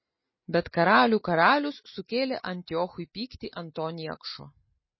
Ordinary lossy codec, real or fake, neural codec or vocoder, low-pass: MP3, 24 kbps; real; none; 7.2 kHz